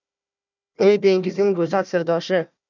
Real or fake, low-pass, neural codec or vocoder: fake; 7.2 kHz; codec, 16 kHz, 1 kbps, FunCodec, trained on Chinese and English, 50 frames a second